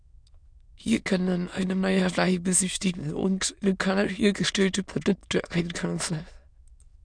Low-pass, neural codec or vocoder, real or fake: 9.9 kHz; autoencoder, 22.05 kHz, a latent of 192 numbers a frame, VITS, trained on many speakers; fake